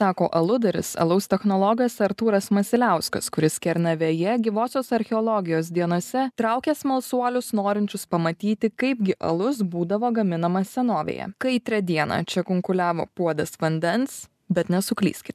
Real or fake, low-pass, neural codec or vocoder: real; 14.4 kHz; none